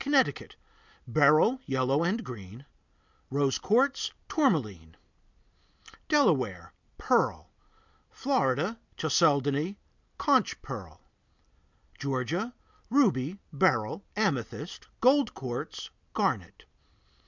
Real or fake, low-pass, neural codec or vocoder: real; 7.2 kHz; none